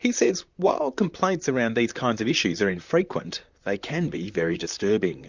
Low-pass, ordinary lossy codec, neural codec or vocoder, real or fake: 7.2 kHz; Opus, 64 kbps; vocoder, 44.1 kHz, 128 mel bands, Pupu-Vocoder; fake